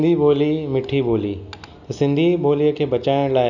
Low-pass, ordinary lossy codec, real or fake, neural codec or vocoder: 7.2 kHz; none; real; none